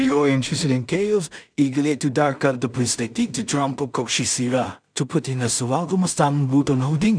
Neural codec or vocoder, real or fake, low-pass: codec, 16 kHz in and 24 kHz out, 0.4 kbps, LongCat-Audio-Codec, two codebook decoder; fake; 9.9 kHz